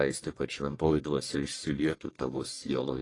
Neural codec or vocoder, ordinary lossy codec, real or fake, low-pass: codec, 44.1 kHz, 1.7 kbps, Pupu-Codec; AAC, 32 kbps; fake; 10.8 kHz